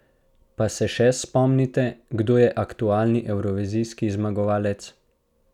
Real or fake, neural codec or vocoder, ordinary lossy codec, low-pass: real; none; none; 19.8 kHz